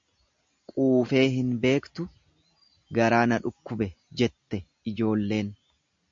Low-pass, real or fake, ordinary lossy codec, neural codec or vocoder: 7.2 kHz; real; MP3, 48 kbps; none